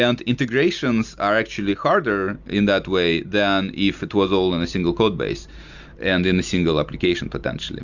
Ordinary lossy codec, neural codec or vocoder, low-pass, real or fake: Opus, 64 kbps; none; 7.2 kHz; real